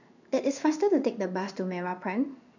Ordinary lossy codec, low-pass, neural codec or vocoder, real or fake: none; 7.2 kHz; codec, 16 kHz in and 24 kHz out, 1 kbps, XY-Tokenizer; fake